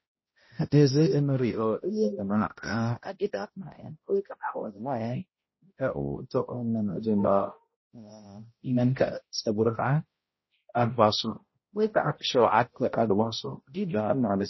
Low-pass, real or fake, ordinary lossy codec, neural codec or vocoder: 7.2 kHz; fake; MP3, 24 kbps; codec, 16 kHz, 0.5 kbps, X-Codec, HuBERT features, trained on balanced general audio